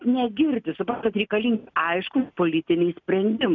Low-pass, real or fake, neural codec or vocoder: 7.2 kHz; real; none